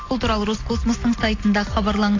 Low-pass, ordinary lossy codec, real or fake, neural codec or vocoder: 7.2 kHz; AAC, 32 kbps; real; none